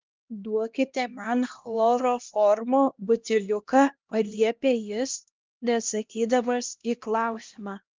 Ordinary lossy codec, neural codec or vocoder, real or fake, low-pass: Opus, 24 kbps; codec, 16 kHz, 1 kbps, X-Codec, HuBERT features, trained on LibriSpeech; fake; 7.2 kHz